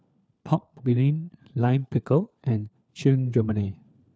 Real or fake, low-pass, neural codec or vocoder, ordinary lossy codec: fake; none; codec, 16 kHz, 4 kbps, FunCodec, trained on LibriTTS, 50 frames a second; none